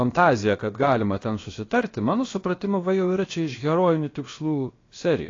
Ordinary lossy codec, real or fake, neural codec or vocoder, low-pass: AAC, 32 kbps; fake; codec, 16 kHz, about 1 kbps, DyCAST, with the encoder's durations; 7.2 kHz